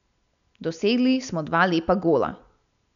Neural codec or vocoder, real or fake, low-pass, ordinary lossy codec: none; real; 7.2 kHz; none